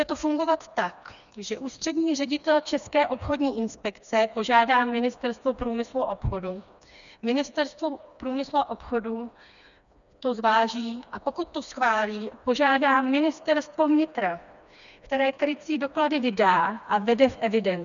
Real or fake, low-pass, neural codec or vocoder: fake; 7.2 kHz; codec, 16 kHz, 2 kbps, FreqCodec, smaller model